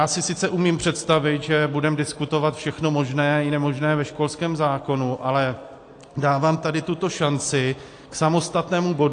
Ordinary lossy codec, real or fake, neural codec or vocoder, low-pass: AAC, 48 kbps; real; none; 9.9 kHz